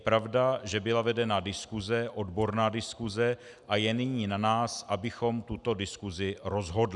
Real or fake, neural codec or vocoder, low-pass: real; none; 10.8 kHz